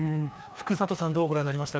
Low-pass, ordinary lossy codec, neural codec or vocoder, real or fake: none; none; codec, 16 kHz, 2 kbps, FreqCodec, larger model; fake